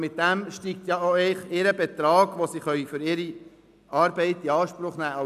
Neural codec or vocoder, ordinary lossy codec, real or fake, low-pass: none; none; real; 14.4 kHz